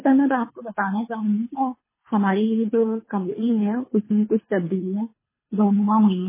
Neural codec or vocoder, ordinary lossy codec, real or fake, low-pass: codec, 24 kHz, 3 kbps, HILCodec; MP3, 16 kbps; fake; 3.6 kHz